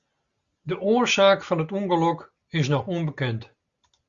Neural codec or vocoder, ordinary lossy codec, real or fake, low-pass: none; Opus, 64 kbps; real; 7.2 kHz